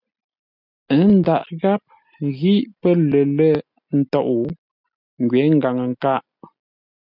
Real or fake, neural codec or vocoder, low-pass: real; none; 5.4 kHz